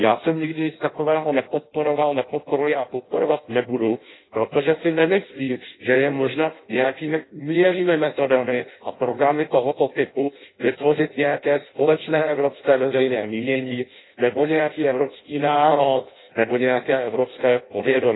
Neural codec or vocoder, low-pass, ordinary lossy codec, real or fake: codec, 16 kHz in and 24 kHz out, 0.6 kbps, FireRedTTS-2 codec; 7.2 kHz; AAC, 16 kbps; fake